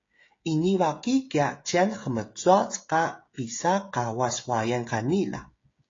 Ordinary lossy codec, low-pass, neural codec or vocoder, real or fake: AAC, 32 kbps; 7.2 kHz; codec, 16 kHz, 16 kbps, FreqCodec, smaller model; fake